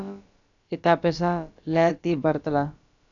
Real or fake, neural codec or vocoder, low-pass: fake; codec, 16 kHz, about 1 kbps, DyCAST, with the encoder's durations; 7.2 kHz